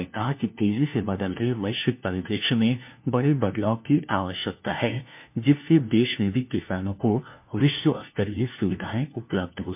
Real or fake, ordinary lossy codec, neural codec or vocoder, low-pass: fake; MP3, 32 kbps; codec, 16 kHz, 1 kbps, FunCodec, trained on LibriTTS, 50 frames a second; 3.6 kHz